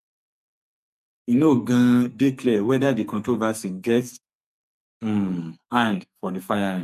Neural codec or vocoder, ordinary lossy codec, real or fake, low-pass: codec, 44.1 kHz, 2.6 kbps, SNAC; none; fake; 14.4 kHz